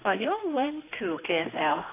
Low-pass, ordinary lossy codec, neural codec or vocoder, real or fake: 3.6 kHz; AAC, 24 kbps; codec, 16 kHz, 2 kbps, X-Codec, HuBERT features, trained on general audio; fake